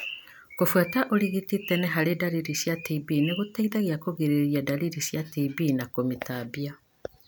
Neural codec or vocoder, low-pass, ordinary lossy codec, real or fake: none; none; none; real